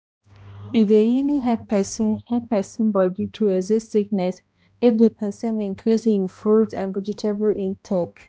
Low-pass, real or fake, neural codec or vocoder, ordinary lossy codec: none; fake; codec, 16 kHz, 1 kbps, X-Codec, HuBERT features, trained on balanced general audio; none